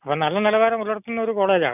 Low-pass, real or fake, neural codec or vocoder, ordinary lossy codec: 3.6 kHz; real; none; none